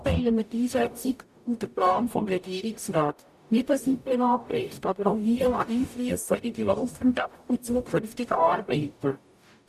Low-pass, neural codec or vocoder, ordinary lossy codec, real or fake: 14.4 kHz; codec, 44.1 kHz, 0.9 kbps, DAC; none; fake